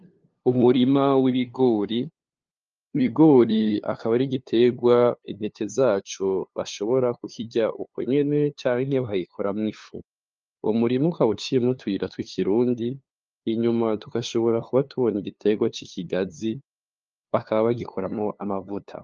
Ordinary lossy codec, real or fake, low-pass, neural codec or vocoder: Opus, 24 kbps; fake; 7.2 kHz; codec, 16 kHz, 2 kbps, FunCodec, trained on LibriTTS, 25 frames a second